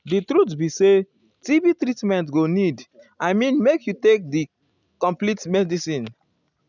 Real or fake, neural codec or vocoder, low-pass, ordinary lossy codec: real; none; 7.2 kHz; none